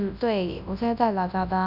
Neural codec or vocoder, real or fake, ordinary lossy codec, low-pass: codec, 24 kHz, 0.9 kbps, WavTokenizer, large speech release; fake; none; 5.4 kHz